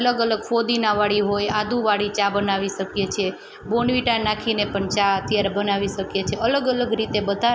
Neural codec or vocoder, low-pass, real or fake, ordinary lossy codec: none; none; real; none